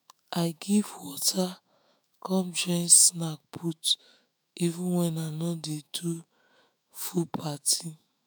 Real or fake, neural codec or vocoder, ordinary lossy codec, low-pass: fake; autoencoder, 48 kHz, 128 numbers a frame, DAC-VAE, trained on Japanese speech; none; none